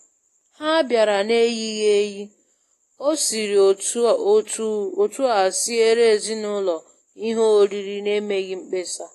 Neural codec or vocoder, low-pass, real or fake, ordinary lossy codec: none; 14.4 kHz; real; AAC, 48 kbps